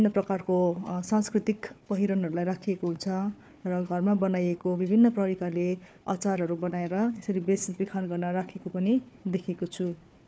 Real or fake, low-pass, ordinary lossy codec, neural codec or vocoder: fake; none; none; codec, 16 kHz, 4 kbps, FunCodec, trained on Chinese and English, 50 frames a second